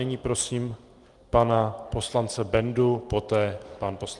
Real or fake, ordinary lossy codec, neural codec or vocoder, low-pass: real; Opus, 24 kbps; none; 10.8 kHz